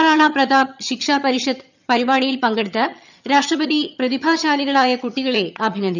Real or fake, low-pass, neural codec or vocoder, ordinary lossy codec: fake; 7.2 kHz; vocoder, 22.05 kHz, 80 mel bands, HiFi-GAN; none